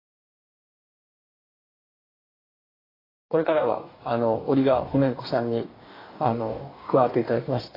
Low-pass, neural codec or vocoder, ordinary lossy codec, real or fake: 5.4 kHz; codec, 44.1 kHz, 2.6 kbps, DAC; AAC, 24 kbps; fake